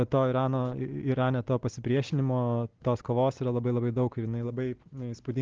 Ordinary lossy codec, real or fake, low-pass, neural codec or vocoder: Opus, 16 kbps; real; 7.2 kHz; none